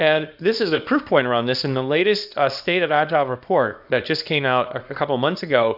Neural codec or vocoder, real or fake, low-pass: codec, 24 kHz, 0.9 kbps, WavTokenizer, small release; fake; 5.4 kHz